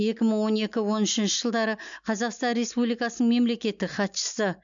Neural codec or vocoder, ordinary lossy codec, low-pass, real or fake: none; MP3, 64 kbps; 7.2 kHz; real